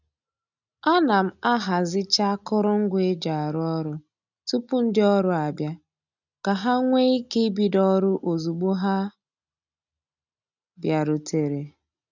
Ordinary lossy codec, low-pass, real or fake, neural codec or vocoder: none; 7.2 kHz; real; none